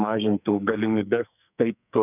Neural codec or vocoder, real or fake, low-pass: codec, 44.1 kHz, 2.6 kbps, SNAC; fake; 3.6 kHz